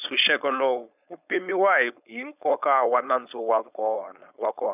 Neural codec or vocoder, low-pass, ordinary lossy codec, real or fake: codec, 16 kHz, 4.8 kbps, FACodec; 3.6 kHz; none; fake